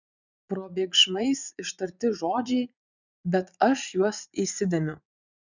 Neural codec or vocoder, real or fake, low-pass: vocoder, 44.1 kHz, 80 mel bands, Vocos; fake; 7.2 kHz